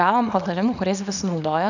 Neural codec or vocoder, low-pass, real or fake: codec, 24 kHz, 0.9 kbps, WavTokenizer, small release; 7.2 kHz; fake